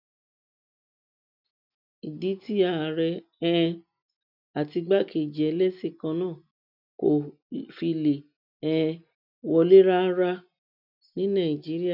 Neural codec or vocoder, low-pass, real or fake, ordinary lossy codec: none; 5.4 kHz; real; none